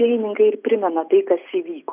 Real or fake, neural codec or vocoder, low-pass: real; none; 3.6 kHz